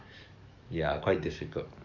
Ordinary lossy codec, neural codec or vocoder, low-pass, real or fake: none; codec, 44.1 kHz, 7.8 kbps, DAC; 7.2 kHz; fake